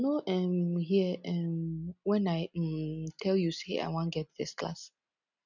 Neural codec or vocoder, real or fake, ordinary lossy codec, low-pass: none; real; none; 7.2 kHz